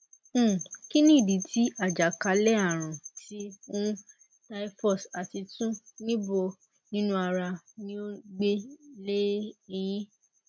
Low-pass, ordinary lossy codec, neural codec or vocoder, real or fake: none; none; none; real